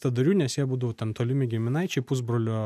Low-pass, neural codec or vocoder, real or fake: 14.4 kHz; none; real